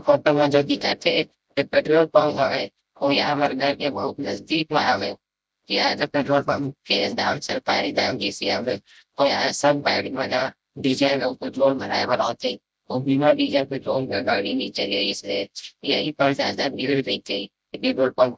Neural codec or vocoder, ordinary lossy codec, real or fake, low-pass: codec, 16 kHz, 0.5 kbps, FreqCodec, smaller model; none; fake; none